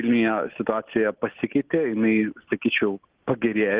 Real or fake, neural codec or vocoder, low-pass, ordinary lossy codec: real; none; 3.6 kHz; Opus, 16 kbps